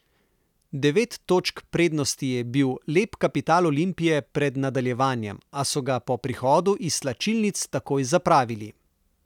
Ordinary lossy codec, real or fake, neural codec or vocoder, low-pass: none; real; none; 19.8 kHz